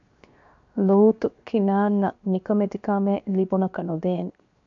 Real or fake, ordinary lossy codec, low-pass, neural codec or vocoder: fake; AAC, 64 kbps; 7.2 kHz; codec, 16 kHz, 0.7 kbps, FocalCodec